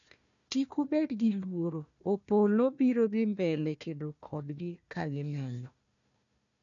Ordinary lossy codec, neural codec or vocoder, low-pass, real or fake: none; codec, 16 kHz, 1 kbps, FunCodec, trained on Chinese and English, 50 frames a second; 7.2 kHz; fake